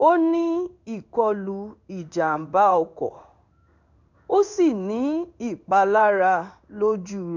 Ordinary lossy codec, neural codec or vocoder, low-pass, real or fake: none; codec, 16 kHz in and 24 kHz out, 1 kbps, XY-Tokenizer; 7.2 kHz; fake